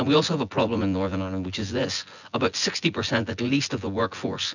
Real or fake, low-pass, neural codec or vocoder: fake; 7.2 kHz; vocoder, 24 kHz, 100 mel bands, Vocos